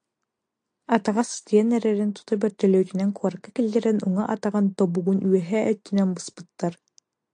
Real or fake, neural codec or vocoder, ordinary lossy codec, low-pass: real; none; AAC, 64 kbps; 9.9 kHz